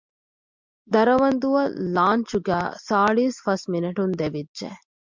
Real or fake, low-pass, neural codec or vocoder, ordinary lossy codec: real; 7.2 kHz; none; MP3, 64 kbps